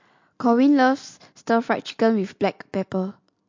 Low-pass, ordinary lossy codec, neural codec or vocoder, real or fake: 7.2 kHz; MP3, 48 kbps; none; real